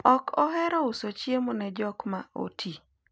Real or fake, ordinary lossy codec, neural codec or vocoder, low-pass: real; none; none; none